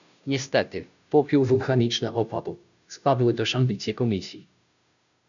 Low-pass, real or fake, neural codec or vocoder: 7.2 kHz; fake; codec, 16 kHz, 0.5 kbps, FunCodec, trained on Chinese and English, 25 frames a second